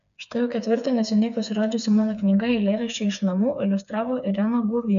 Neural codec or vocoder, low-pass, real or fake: codec, 16 kHz, 4 kbps, FreqCodec, smaller model; 7.2 kHz; fake